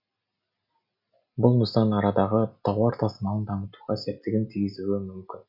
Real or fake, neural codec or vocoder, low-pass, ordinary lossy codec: real; none; 5.4 kHz; none